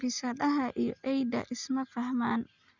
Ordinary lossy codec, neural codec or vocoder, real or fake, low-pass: Opus, 64 kbps; none; real; 7.2 kHz